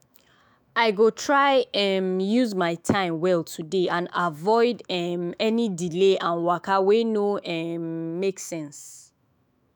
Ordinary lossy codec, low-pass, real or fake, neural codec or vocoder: none; none; fake; autoencoder, 48 kHz, 128 numbers a frame, DAC-VAE, trained on Japanese speech